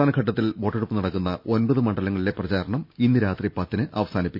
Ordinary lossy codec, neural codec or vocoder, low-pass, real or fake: none; none; 5.4 kHz; real